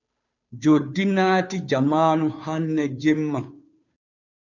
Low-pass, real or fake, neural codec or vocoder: 7.2 kHz; fake; codec, 16 kHz, 2 kbps, FunCodec, trained on Chinese and English, 25 frames a second